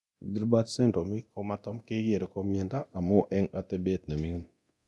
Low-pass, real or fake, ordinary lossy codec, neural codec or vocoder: none; fake; none; codec, 24 kHz, 0.9 kbps, DualCodec